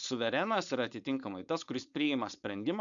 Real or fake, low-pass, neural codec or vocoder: fake; 7.2 kHz; codec, 16 kHz, 4.8 kbps, FACodec